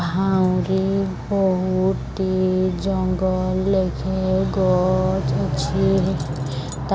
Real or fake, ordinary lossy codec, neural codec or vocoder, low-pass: real; none; none; none